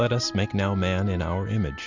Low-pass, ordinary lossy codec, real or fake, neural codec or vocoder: 7.2 kHz; Opus, 64 kbps; real; none